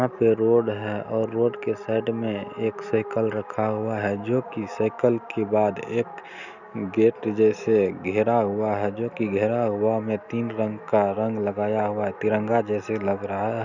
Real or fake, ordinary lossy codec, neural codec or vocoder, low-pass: real; none; none; 7.2 kHz